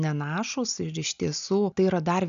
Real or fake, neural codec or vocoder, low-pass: real; none; 7.2 kHz